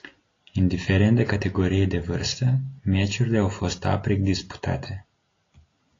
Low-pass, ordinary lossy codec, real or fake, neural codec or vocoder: 7.2 kHz; AAC, 32 kbps; real; none